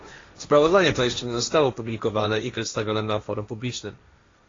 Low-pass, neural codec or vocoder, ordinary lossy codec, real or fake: 7.2 kHz; codec, 16 kHz, 1.1 kbps, Voila-Tokenizer; AAC, 32 kbps; fake